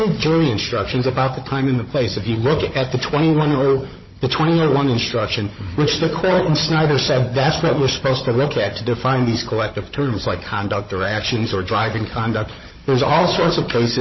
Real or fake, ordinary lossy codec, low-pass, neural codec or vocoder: fake; MP3, 24 kbps; 7.2 kHz; codec, 16 kHz, 16 kbps, FunCodec, trained on Chinese and English, 50 frames a second